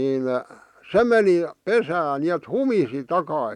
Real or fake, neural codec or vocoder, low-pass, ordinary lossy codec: real; none; 19.8 kHz; none